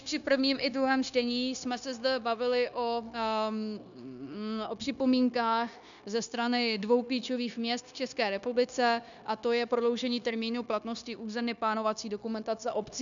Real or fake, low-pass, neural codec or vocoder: fake; 7.2 kHz; codec, 16 kHz, 0.9 kbps, LongCat-Audio-Codec